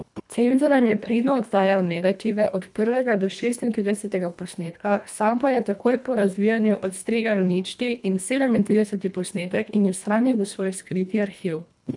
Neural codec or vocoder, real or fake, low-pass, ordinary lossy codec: codec, 24 kHz, 1.5 kbps, HILCodec; fake; 10.8 kHz; none